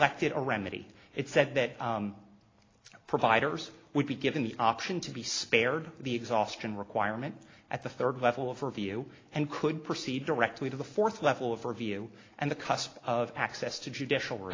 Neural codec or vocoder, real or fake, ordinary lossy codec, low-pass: none; real; AAC, 32 kbps; 7.2 kHz